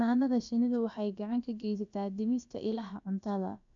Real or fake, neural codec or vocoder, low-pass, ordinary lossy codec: fake; codec, 16 kHz, about 1 kbps, DyCAST, with the encoder's durations; 7.2 kHz; AAC, 64 kbps